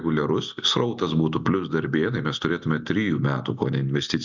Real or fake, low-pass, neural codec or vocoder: real; 7.2 kHz; none